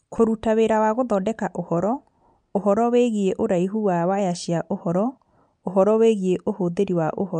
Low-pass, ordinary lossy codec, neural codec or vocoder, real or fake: 9.9 kHz; MP3, 64 kbps; none; real